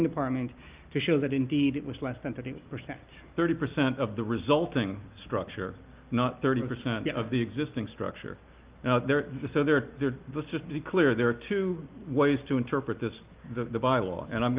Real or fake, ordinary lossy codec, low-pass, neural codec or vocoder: real; Opus, 24 kbps; 3.6 kHz; none